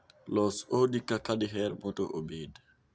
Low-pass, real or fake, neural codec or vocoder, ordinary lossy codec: none; real; none; none